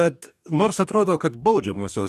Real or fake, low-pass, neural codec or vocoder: fake; 14.4 kHz; codec, 44.1 kHz, 2.6 kbps, DAC